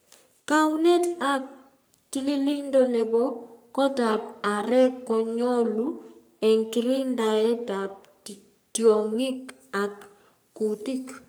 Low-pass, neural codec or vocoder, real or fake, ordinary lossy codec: none; codec, 44.1 kHz, 3.4 kbps, Pupu-Codec; fake; none